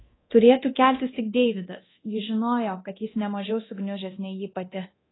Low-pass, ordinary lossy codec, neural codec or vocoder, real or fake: 7.2 kHz; AAC, 16 kbps; codec, 24 kHz, 0.9 kbps, DualCodec; fake